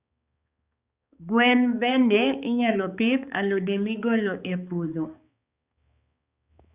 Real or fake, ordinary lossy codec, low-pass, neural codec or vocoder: fake; Opus, 64 kbps; 3.6 kHz; codec, 16 kHz, 4 kbps, X-Codec, HuBERT features, trained on balanced general audio